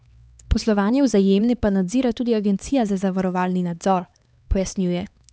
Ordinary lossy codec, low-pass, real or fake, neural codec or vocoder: none; none; fake; codec, 16 kHz, 2 kbps, X-Codec, HuBERT features, trained on LibriSpeech